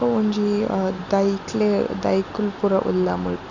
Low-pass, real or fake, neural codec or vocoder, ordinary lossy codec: 7.2 kHz; real; none; none